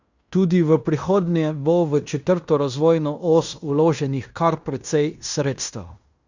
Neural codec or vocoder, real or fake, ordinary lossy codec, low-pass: codec, 16 kHz in and 24 kHz out, 0.9 kbps, LongCat-Audio-Codec, fine tuned four codebook decoder; fake; Opus, 64 kbps; 7.2 kHz